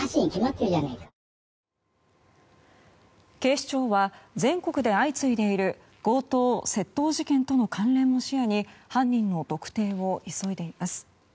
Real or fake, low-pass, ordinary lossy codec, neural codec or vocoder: real; none; none; none